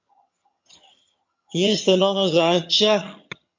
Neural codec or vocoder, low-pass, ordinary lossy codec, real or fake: vocoder, 22.05 kHz, 80 mel bands, HiFi-GAN; 7.2 kHz; MP3, 48 kbps; fake